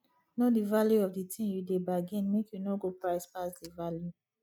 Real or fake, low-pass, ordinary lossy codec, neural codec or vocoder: real; 19.8 kHz; none; none